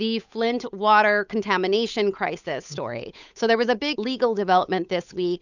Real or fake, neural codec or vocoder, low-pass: fake; codec, 16 kHz, 8 kbps, FunCodec, trained on Chinese and English, 25 frames a second; 7.2 kHz